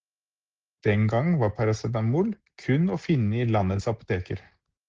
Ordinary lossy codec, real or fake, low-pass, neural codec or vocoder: Opus, 16 kbps; real; 7.2 kHz; none